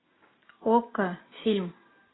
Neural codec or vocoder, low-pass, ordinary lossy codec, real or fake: none; 7.2 kHz; AAC, 16 kbps; real